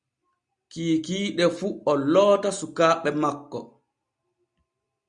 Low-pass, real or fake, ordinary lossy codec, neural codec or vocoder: 9.9 kHz; real; Opus, 64 kbps; none